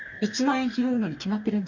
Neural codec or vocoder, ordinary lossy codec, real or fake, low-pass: codec, 44.1 kHz, 2.6 kbps, DAC; none; fake; 7.2 kHz